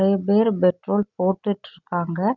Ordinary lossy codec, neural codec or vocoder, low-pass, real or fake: none; none; 7.2 kHz; real